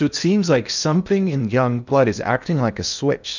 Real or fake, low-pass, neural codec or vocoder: fake; 7.2 kHz; codec, 16 kHz in and 24 kHz out, 0.8 kbps, FocalCodec, streaming, 65536 codes